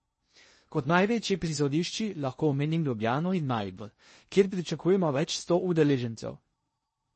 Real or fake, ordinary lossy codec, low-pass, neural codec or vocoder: fake; MP3, 32 kbps; 10.8 kHz; codec, 16 kHz in and 24 kHz out, 0.6 kbps, FocalCodec, streaming, 2048 codes